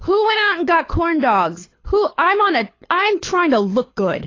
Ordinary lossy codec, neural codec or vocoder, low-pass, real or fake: AAC, 32 kbps; codec, 24 kHz, 6 kbps, HILCodec; 7.2 kHz; fake